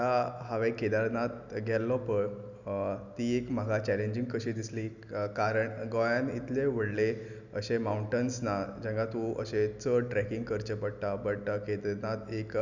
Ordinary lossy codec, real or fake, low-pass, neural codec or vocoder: none; real; 7.2 kHz; none